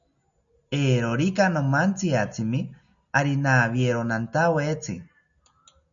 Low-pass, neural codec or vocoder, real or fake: 7.2 kHz; none; real